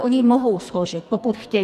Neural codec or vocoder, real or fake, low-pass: codec, 44.1 kHz, 2.6 kbps, SNAC; fake; 14.4 kHz